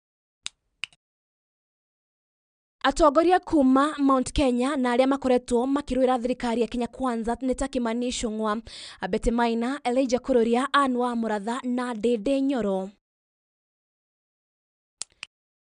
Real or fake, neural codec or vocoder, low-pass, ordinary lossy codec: real; none; 9.9 kHz; none